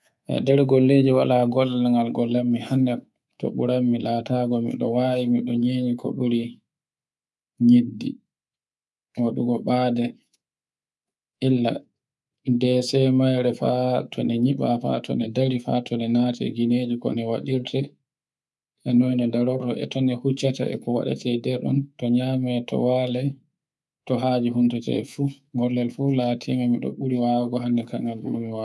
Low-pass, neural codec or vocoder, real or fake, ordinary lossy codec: none; codec, 24 kHz, 3.1 kbps, DualCodec; fake; none